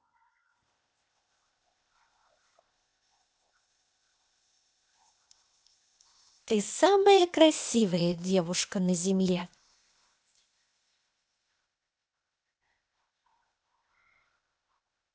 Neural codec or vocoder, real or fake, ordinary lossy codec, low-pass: codec, 16 kHz, 0.8 kbps, ZipCodec; fake; none; none